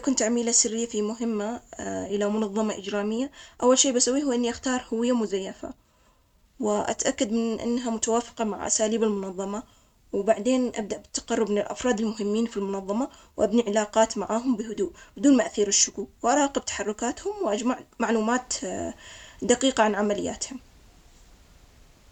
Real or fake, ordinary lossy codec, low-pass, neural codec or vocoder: real; none; 19.8 kHz; none